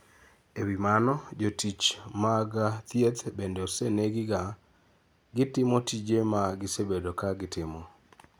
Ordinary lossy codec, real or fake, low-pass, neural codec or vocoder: none; real; none; none